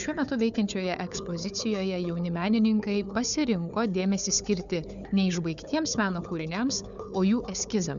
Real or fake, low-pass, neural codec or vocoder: fake; 7.2 kHz; codec, 16 kHz, 4 kbps, FunCodec, trained on Chinese and English, 50 frames a second